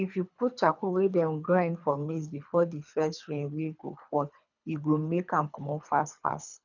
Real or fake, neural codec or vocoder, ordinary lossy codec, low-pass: fake; codec, 24 kHz, 3 kbps, HILCodec; none; 7.2 kHz